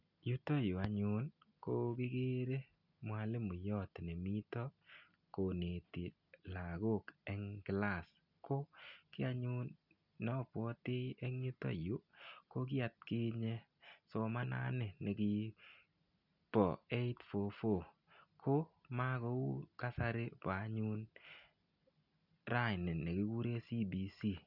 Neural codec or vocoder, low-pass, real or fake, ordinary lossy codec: none; 5.4 kHz; real; none